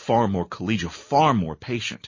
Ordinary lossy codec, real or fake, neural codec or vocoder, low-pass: MP3, 32 kbps; real; none; 7.2 kHz